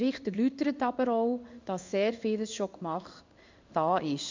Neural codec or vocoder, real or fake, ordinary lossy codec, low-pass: codec, 16 kHz in and 24 kHz out, 1 kbps, XY-Tokenizer; fake; MP3, 64 kbps; 7.2 kHz